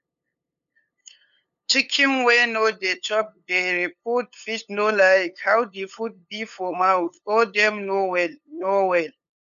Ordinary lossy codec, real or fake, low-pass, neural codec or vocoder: none; fake; 7.2 kHz; codec, 16 kHz, 8 kbps, FunCodec, trained on LibriTTS, 25 frames a second